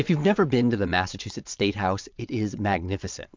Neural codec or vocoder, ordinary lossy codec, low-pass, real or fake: vocoder, 22.05 kHz, 80 mel bands, WaveNeXt; MP3, 64 kbps; 7.2 kHz; fake